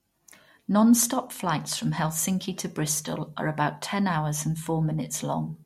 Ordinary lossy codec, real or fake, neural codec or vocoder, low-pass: MP3, 64 kbps; real; none; 19.8 kHz